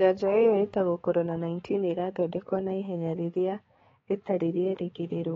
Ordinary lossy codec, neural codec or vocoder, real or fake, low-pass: AAC, 24 kbps; codec, 16 kHz, 4 kbps, X-Codec, HuBERT features, trained on balanced general audio; fake; 7.2 kHz